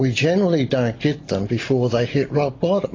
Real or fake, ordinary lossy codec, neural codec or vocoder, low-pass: real; AAC, 32 kbps; none; 7.2 kHz